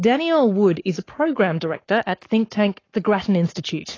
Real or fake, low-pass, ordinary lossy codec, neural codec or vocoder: fake; 7.2 kHz; AAC, 32 kbps; codec, 44.1 kHz, 7.8 kbps, Pupu-Codec